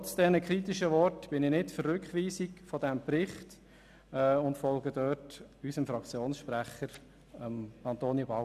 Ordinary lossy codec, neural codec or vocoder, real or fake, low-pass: none; none; real; 14.4 kHz